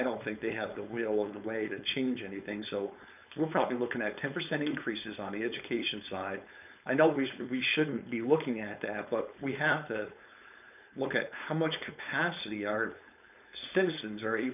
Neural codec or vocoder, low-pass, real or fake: codec, 16 kHz, 4.8 kbps, FACodec; 3.6 kHz; fake